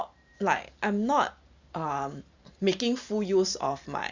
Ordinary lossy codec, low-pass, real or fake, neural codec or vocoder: Opus, 64 kbps; 7.2 kHz; fake; vocoder, 44.1 kHz, 128 mel bands every 512 samples, BigVGAN v2